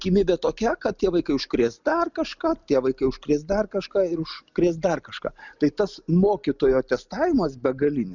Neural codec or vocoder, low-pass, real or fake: none; 7.2 kHz; real